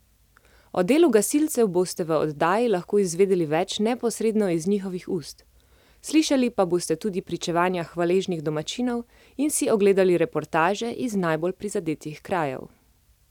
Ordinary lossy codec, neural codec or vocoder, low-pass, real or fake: none; none; 19.8 kHz; real